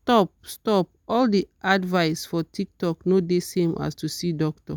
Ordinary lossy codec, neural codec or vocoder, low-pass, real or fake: none; none; none; real